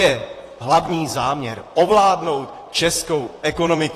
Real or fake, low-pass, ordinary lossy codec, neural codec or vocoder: fake; 14.4 kHz; AAC, 48 kbps; vocoder, 44.1 kHz, 128 mel bands, Pupu-Vocoder